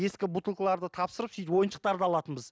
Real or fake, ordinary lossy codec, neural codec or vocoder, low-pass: real; none; none; none